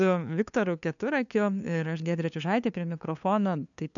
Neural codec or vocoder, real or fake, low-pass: codec, 16 kHz, 2 kbps, FunCodec, trained on LibriTTS, 25 frames a second; fake; 7.2 kHz